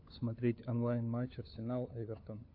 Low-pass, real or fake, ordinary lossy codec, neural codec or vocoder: 5.4 kHz; fake; AAC, 32 kbps; codec, 16 kHz, 4 kbps, FunCodec, trained on LibriTTS, 50 frames a second